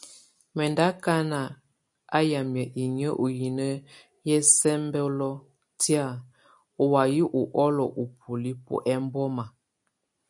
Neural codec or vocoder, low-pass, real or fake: none; 10.8 kHz; real